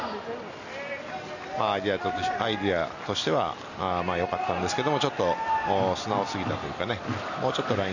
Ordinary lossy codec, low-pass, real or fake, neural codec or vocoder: none; 7.2 kHz; real; none